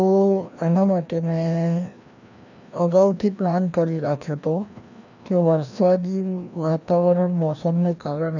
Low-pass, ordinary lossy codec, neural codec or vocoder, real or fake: 7.2 kHz; none; codec, 16 kHz, 1 kbps, FreqCodec, larger model; fake